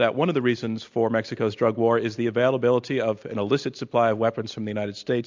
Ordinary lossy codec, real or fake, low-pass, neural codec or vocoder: MP3, 64 kbps; real; 7.2 kHz; none